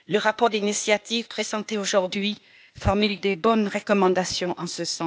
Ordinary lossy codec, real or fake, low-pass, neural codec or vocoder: none; fake; none; codec, 16 kHz, 0.8 kbps, ZipCodec